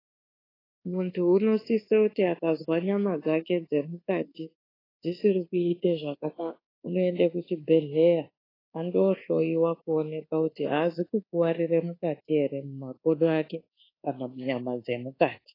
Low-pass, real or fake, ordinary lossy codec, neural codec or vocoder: 5.4 kHz; fake; AAC, 24 kbps; codec, 24 kHz, 1.2 kbps, DualCodec